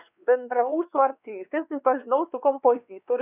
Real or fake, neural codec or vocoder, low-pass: fake; codec, 16 kHz, 2 kbps, X-Codec, WavLM features, trained on Multilingual LibriSpeech; 3.6 kHz